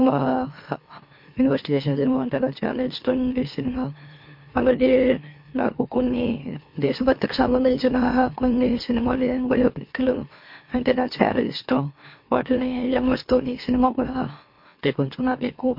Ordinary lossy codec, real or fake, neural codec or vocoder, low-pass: MP3, 32 kbps; fake; autoencoder, 44.1 kHz, a latent of 192 numbers a frame, MeloTTS; 5.4 kHz